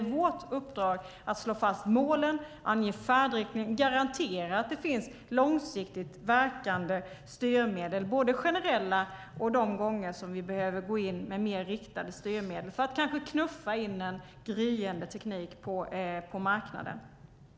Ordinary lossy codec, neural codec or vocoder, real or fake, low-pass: none; none; real; none